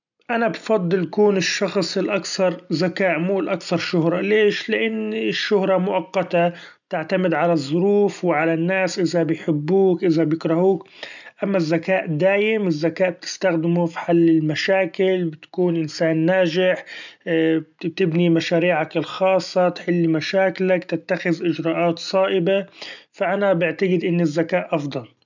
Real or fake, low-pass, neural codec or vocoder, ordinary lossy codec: real; 7.2 kHz; none; none